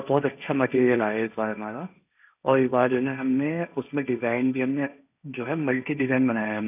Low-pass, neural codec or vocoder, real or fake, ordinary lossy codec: 3.6 kHz; codec, 16 kHz, 1.1 kbps, Voila-Tokenizer; fake; none